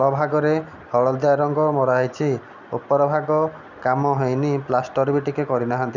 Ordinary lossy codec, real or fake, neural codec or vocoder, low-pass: none; real; none; 7.2 kHz